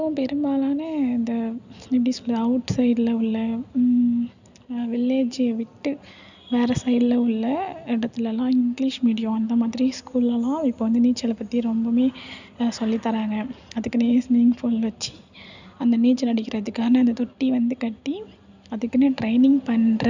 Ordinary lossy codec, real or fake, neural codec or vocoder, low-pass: none; real; none; 7.2 kHz